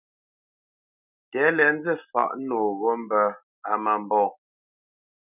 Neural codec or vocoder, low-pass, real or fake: none; 3.6 kHz; real